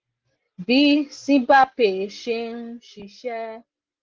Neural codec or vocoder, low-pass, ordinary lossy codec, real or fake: none; 7.2 kHz; Opus, 16 kbps; real